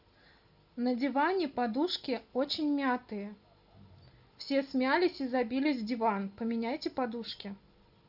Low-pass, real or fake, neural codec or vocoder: 5.4 kHz; real; none